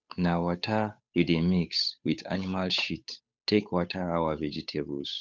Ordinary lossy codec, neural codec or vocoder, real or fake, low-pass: none; codec, 16 kHz, 8 kbps, FunCodec, trained on Chinese and English, 25 frames a second; fake; none